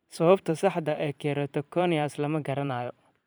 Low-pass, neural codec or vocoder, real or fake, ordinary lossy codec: none; vocoder, 44.1 kHz, 128 mel bands every 512 samples, BigVGAN v2; fake; none